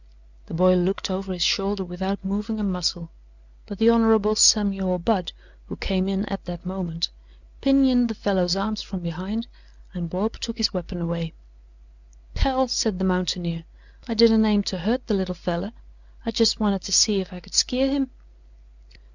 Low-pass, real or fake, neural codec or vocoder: 7.2 kHz; real; none